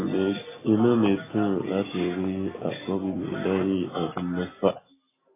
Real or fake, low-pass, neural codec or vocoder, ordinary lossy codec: real; 3.6 kHz; none; AAC, 16 kbps